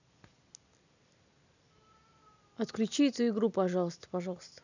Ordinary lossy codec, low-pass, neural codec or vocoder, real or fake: none; 7.2 kHz; none; real